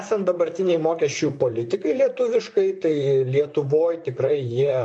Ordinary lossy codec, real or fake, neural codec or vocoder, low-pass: MP3, 48 kbps; fake; vocoder, 44.1 kHz, 128 mel bands, Pupu-Vocoder; 10.8 kHz